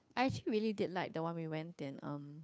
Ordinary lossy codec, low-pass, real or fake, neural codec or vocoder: none; none; fake; codec, 16 kHz, 2 kbps, FunCodec, trained on Chinese and English, 25 frames a second